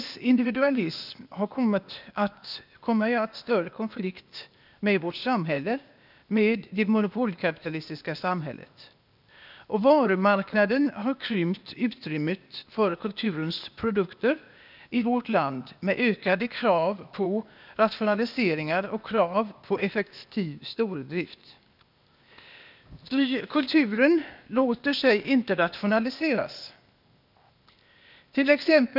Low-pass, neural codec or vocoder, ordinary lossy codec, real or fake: 5.4 kHz; codec, 16 kHz, 0.8 kbps, ZipCodec; none; fake